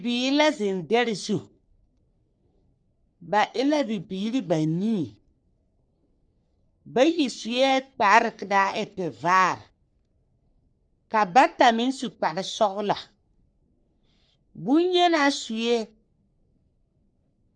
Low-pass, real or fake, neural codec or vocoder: 9.9 kHz; fake; codec, 44.1 kHz, 3.4 kbps, Pupu-Codec